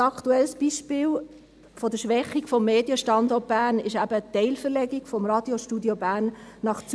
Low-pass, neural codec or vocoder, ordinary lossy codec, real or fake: none; none; none; real